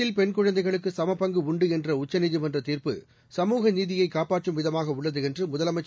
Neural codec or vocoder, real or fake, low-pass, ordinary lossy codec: none; real; none; none